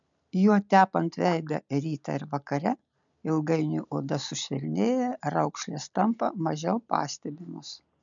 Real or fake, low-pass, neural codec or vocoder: real; 7.2 kHz; none